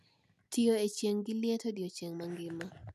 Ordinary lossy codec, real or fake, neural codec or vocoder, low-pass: none; real; none; 14.4 kHz